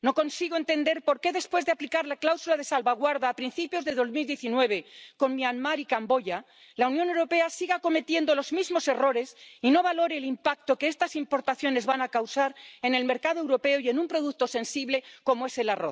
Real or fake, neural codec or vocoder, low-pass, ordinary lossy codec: real; none; none; none